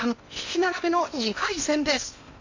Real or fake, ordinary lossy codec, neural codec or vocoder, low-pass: fake; AAC, 48 kbps; codec, 16 kHz in and 24 kHz out, 0.8 kbps, FocalCodec, streaming, 65536 codes; 7.2 kHz